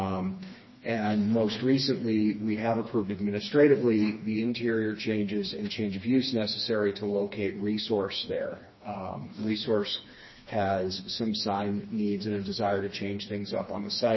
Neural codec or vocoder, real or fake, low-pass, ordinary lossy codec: codec, 16 kHz, 2 kbps, FreqCodec, smaller model; fake; 7.2 kHz; MP3, 24 kbps